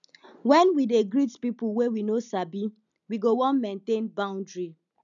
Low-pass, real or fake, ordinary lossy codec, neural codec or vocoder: 7.2 kHz; real; none; none